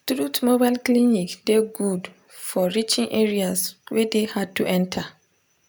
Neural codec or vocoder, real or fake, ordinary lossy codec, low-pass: none; real; none; none